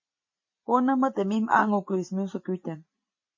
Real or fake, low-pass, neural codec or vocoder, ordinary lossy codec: real; 7.2 kHz; none; MP3, 32 kbps